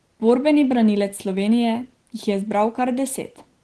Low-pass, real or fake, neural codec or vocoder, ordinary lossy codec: 10.8 kHz; real; none; Opus, 16 kbps